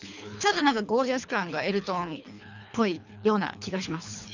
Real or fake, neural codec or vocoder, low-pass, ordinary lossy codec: fake; codec, 24 kHz, 3 kbps, HILCodec; 7.2 kHz; none